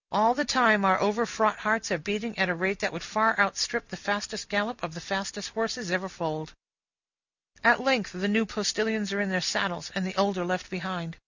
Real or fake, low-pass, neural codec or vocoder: real; 7.2 kHz; none